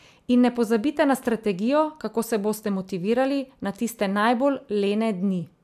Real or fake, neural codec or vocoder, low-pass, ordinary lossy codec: real; none; 14.4 kHz; AAC, 96 kbps